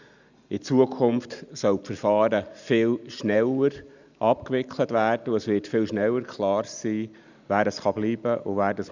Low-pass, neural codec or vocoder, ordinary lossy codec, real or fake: 7.2 kHz; none; none; real